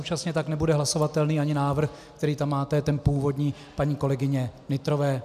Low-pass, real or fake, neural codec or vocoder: 14.4 kHz; real; none